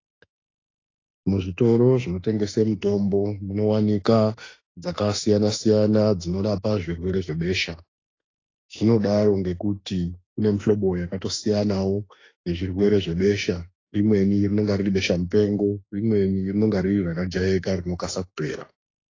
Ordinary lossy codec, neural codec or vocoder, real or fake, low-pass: AAC, 32 kbps; autoencoder, 48 kHz, 32 numbers a frame, DAC-VAE, trained on Japanese speech; fake; 7.2 kHz